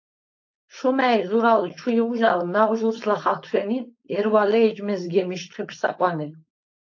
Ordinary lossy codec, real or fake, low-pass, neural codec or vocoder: AAC, 48 kbps; fake; 7.2 kHz; codec, 16 kHz, 4.8 kbps, FACodec